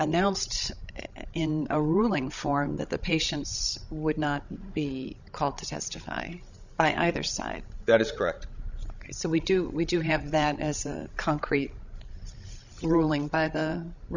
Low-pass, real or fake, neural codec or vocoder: 7.2 kHz; fake; codec, 16 kHz, 16 kbps, FreqCodec, larger model